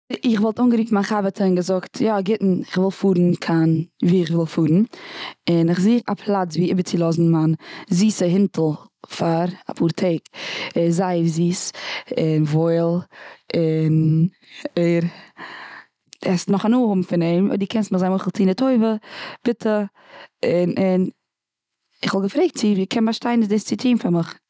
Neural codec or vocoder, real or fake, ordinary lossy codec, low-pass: none; real; none; none